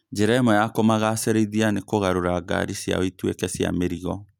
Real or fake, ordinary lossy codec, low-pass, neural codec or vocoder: real; none; 19.8 kHz; none